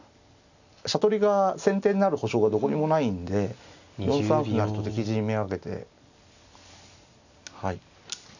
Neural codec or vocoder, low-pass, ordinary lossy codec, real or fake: none; 7.2 kHz; none; real